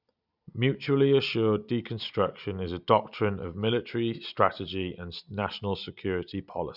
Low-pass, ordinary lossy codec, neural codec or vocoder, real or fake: 5.4 kHz; none; none; real